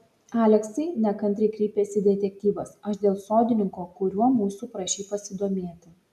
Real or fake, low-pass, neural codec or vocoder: real; 14.4 kHz; none